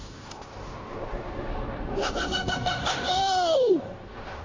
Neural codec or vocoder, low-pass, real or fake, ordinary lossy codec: codec, 16 kHz in and 24 kHz out, 0.9 kbps, LongCat-Audio-Codec, fine tuned four codebook decoder; 7.2 kHz; fake; AAC, 32 kbps